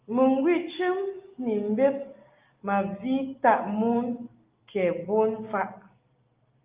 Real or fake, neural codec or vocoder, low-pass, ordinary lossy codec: real; none; 3.6 kHz; Opus, 32 kbps